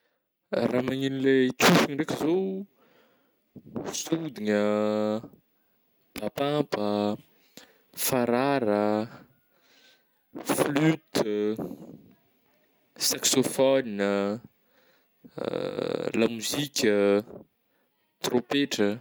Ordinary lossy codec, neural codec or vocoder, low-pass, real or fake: none; none; none; real